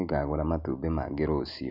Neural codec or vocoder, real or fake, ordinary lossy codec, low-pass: none; real; none; 5.4 kHz